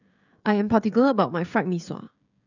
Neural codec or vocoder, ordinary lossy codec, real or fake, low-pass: codec, 16 kHz, 16 kbps, FreqCodec, smaller model; none; fake; 7.2 kHz